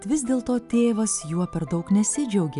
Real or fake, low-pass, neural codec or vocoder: real; 10.8 kHz; none